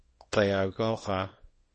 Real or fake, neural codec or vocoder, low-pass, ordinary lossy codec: fake; codec, 24 kHz, 0.9 kbps, WavTokenizer, small release; 10.8 kHz; MP3, 32 kbps